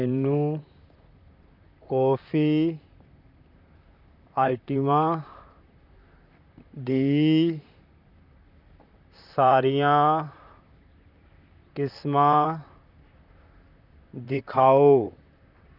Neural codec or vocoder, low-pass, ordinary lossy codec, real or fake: vocoder, 44.1 kHz, 128 mel bands, Pupu-Vocoder; 5.4 kHz; none; fake